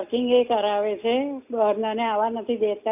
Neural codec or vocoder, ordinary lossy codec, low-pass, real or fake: none; none; 3.6 kHz; real